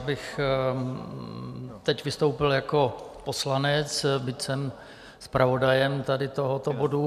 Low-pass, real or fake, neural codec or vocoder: 14.4 kHz; real; none